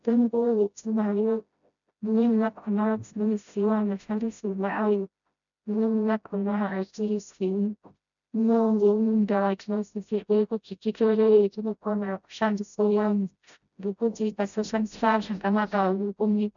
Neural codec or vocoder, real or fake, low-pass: codec, 16 kHz, 0.5 kbps, FreqCodec, smaller model; fake; 7.2 kHz